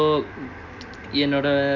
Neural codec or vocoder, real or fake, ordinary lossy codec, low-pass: none; real; none; 7.2 kHz